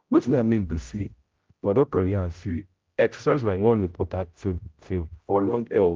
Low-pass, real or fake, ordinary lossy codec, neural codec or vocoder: 7.2 kHz; fake; Opus, 32 kbps; codec, 16 kHz, 0.5 kbps, X-Codec, HuBERT features, trained on general audio